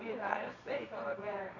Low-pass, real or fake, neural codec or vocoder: 7.2 kHz; fake; codec, 24 kHz, 0.9 kbps, WavTokenizer, medium music audio release